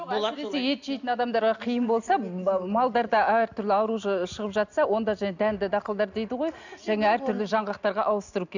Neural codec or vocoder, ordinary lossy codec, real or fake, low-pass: none; none; real; 7.2 kHz